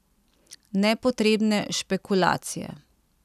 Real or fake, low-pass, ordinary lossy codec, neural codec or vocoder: real; 14.4 kHz; none; none